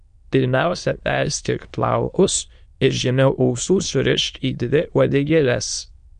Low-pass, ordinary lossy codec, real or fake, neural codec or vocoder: 9.9 kHz; MP3, 64 kbps; fake; autoencoder, 22.05 kHz, a latent of 192 numbers a frame, VITS, trained on many speakers